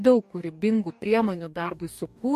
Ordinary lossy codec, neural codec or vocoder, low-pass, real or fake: MP3, 64 kbps; codec, 44.1 kHz, 2.6 kbps, DAC; 14.4 kHz; fake